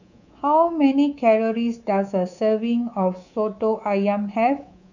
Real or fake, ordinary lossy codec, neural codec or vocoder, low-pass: fake; none; codec, 24 kHz, 3.1 kbps, DualCodec; 7.2 kHz